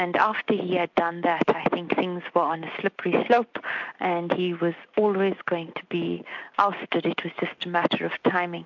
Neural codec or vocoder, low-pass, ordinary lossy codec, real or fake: none; 7.2 kHz; MP3, 64 kbps; real